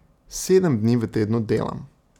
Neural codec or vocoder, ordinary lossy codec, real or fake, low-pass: none; none; real; 19.8 kHz